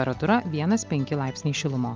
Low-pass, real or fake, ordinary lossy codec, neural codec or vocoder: 7.2 kHz; real; Opus, 24 kbps; none